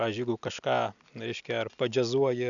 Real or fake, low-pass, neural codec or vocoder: real; 7.2 kHz; none